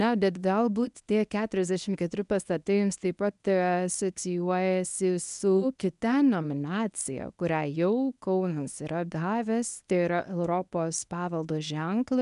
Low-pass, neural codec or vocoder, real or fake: 10.8 kHz; codec, 24 kHz, 0.9 kbps, WavTokenizer, medium speech release version 1; fake